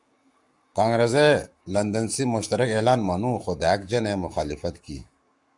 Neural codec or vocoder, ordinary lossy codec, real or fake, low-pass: codec, 44.1 kHz, 7.8 kbps, Pupu-Codec; MP3, 96 kbps; fake; 10.8 kHz